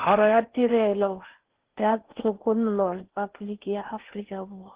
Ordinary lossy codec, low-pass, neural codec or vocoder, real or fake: Opus, 16 kbps; 3.6 kHz; codec, 16 kHz in and 24 kHz out, 0.6 kbps, FocalCodec, streaming, 4096 codes; fake